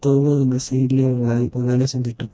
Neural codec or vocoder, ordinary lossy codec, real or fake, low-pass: codec, 16 kHz, 1 kbps, FreqCodec, smaller model; none; fake; none